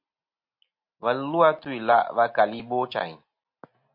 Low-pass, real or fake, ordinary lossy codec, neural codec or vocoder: 5.4 kHz; real; MP3, 32 kbps; none